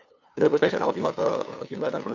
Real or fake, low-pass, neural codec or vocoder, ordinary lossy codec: fake; 7.2 kHz; codec, 16 kHz, 2 kbps, FunCodec, trained on LibriTTS, 25 frames a second; AAC, 48 kbps